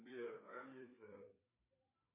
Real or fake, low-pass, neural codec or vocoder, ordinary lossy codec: fake; 3.6 kHz; codec, 16 kHz, 4 kbps, FreqCodec, larger model; MP3, 24 kbps